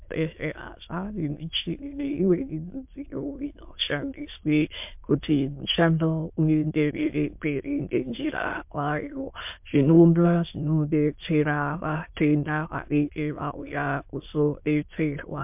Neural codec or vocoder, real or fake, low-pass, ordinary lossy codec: autoencoder, 22.05 kHz, a latent of 192 numbers a frame, VITS, trained on many speakers; fake; 3.6 kHz; MP3, 32 kbps